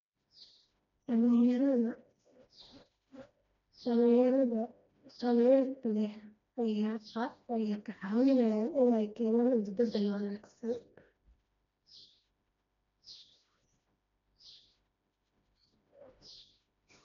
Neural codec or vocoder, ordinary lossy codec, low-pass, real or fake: codec, 16 kHz, 1 kbps, FreqCodec, smaller model; MP3, 64 kbps; 7.2 kHz; fake